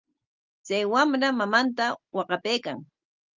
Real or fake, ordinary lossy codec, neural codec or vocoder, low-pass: real; Opus, 32 kbps; none; 7.2 kHz